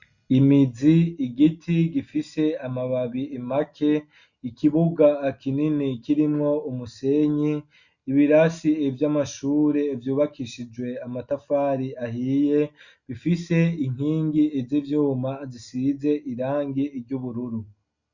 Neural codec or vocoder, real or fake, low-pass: none; real; 7.2 kHz